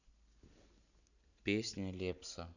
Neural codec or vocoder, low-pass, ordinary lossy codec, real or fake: none; 7.2 kHz; none; real